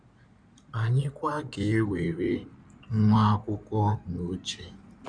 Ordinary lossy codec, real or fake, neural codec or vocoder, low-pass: none; fake; codec, 16 kHz in and 24 kHz out, 2.2 kbps, FireRedTTS-2 codec; 9.9 kHz